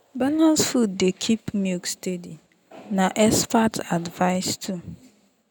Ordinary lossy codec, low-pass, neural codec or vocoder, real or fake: none; none; none; real